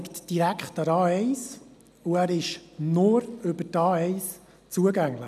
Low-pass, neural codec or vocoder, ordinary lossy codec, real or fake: 14.4 kHz; none; none; real